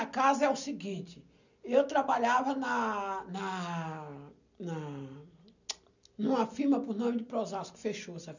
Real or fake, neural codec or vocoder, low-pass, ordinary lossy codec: real; none; 7.2 kHz; none